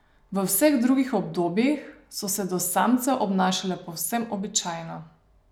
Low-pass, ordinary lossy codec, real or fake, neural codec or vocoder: none; none; real; none